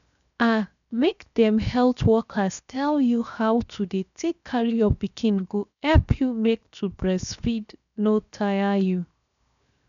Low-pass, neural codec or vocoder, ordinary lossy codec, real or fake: 7.2 kHz; codec, 16 kHz, 0.7 kbps, FocalCodec; none; fake